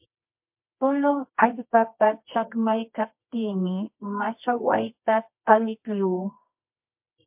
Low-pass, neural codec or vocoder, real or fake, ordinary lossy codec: 3.6 kHz; codec, 24 kHz, 0.9 kbps, WavTokenizer, medium music audio release; fake; MP3, 32 kbps